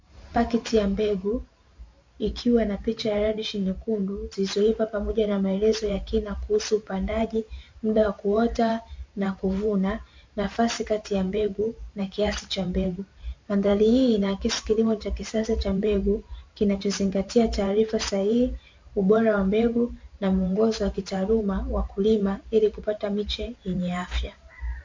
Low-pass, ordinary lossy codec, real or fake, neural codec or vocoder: 7.2 kHz; MP3, 48 kbps; fake; vocoder, 44.1 kHz, 128 mel bands every 512 samples, BigVGAN v2